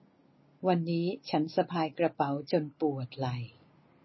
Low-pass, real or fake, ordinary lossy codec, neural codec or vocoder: 7.2 kHz; real; MP3, 24 kbps; none